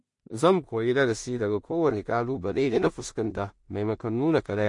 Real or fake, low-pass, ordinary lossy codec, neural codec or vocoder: fake; 10.8 kHz; MP3, 48 kbps; codec, 16 kHz in and 24 kHz out, 0.4 kbps, LongCat-Audio-Codec, two codebook decoder